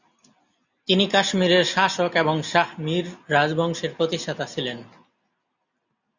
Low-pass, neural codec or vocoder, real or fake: 7.2 kHz; none; real